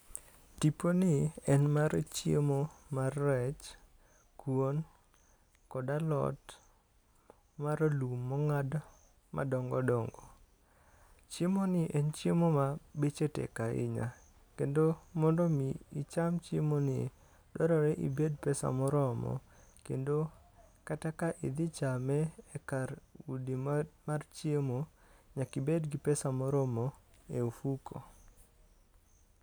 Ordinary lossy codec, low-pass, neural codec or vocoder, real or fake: none; none; none; real